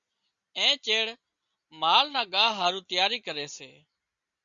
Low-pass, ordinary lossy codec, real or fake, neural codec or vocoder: 7.2 kHz; Opus, 64 kbps; real; none